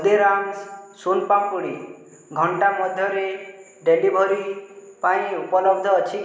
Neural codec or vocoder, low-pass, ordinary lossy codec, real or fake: none; none; none; real